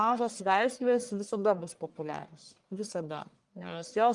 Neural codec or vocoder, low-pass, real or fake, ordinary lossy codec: codec, 44.1 kHz, 1.7 kbps, Pupu-Codec; 10.8 kHz; fake; Opus, 32 kbps